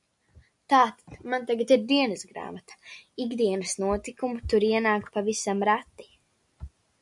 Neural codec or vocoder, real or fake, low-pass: none; real; 10.8 kHz